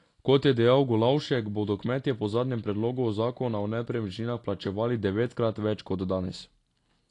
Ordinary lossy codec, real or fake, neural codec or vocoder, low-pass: AAC, 48 kbps; real; none; 10.8 kHz